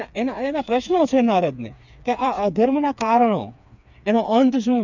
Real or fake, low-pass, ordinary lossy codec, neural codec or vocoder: fake; 7.2 kHz; none; codec, 16 kHz, 4 kbps, FreqCodec, smaller model